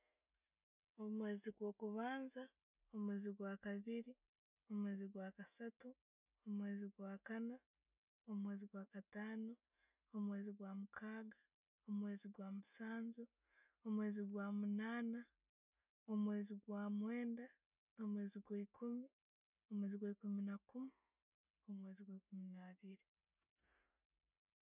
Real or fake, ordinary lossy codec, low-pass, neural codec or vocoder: real; none; 3.6 kHz; none